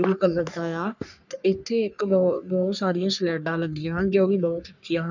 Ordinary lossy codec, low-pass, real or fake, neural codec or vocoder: none; 7.2 kHz; fake; codec, 44.1 kHz, 3.4 kbps, Pupu-Codec